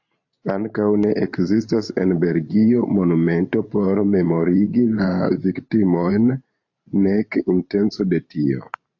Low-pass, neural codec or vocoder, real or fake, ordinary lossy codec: 7.2 kHz; vocoder, 44.1 kHz, 128 mel bands every 512 samples, BigVGAN v2; fake; AAC, 48 kbps